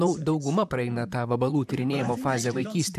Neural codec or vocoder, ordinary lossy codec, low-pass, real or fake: vocoder, 44.1 kHz, 128 mel bands, Pupu-Vocoder; MP3, 96 kbps; 14.4 kHz; fake